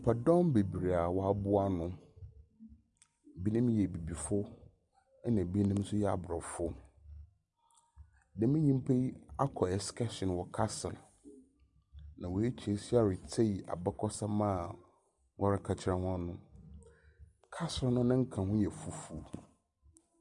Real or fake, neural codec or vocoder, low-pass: real; none; 10.8 kHz